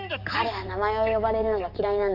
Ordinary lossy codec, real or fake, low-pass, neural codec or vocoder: none; fake; 5.4 kHz; codec, 44.1 kHz, 7.8 kbps, DAC